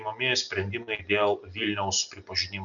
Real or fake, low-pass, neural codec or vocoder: real; 7.2 kHz; none